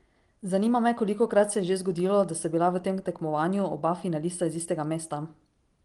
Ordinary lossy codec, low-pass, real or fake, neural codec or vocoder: Opus, 24 kbps; 10.8 kHz; real; none